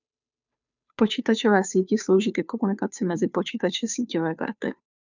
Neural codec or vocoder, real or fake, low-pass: codec, 16 kHz, 2 kbps, FunCodec, trained on Chinese and English, 25 frames a second; fake; 7.2 kHz